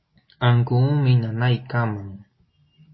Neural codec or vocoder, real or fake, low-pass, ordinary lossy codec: none; real; 7.2 kHz; MP3, 24 kbps